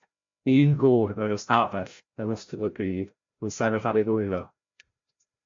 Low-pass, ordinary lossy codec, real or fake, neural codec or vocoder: 7.2 kHz; MP3, 48 kbps; fake; codec, 16 kHz, 0.5 kbps, FreqCodec, larger model